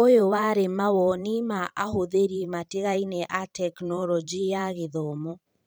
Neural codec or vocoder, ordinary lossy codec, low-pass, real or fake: vocoder, 44.1 kHz, 128 mel bands every 512 samples, BigVGAN v2; none; none; fake